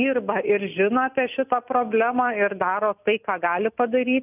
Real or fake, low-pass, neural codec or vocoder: real; 3.6 kHz; none